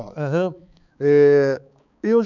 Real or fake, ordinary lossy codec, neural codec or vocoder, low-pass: fake; none; codec, 16 kHz, 4 kbps, X-Codec, HuBERT features, trained on balanced general audio; 7.2 kHz